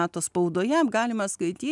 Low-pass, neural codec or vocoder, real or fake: 10.8 kHz; none; real